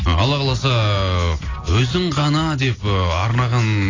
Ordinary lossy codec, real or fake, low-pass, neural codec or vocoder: AAC, 32 kbps; real; 7.2 kHz; none